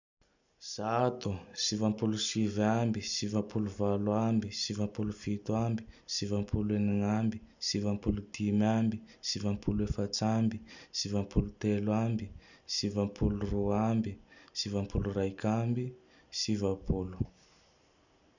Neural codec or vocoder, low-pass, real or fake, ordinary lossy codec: none; 7.2 kHz; real; none